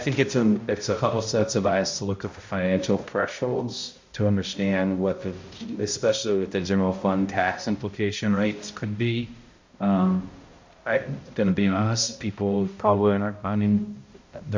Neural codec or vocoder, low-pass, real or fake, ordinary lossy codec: codec, 16 kHz, 0.5 kbps, X-Codec, HuBERT features, trained on balanced general audio; 7.2 kHz; fake; MP3, 48 kbps